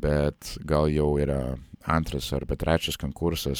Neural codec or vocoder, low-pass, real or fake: vocoder, 44.1 kHz, 128 mel bands every 512 samples, BigVGAN v2; 19.8 kHz; fake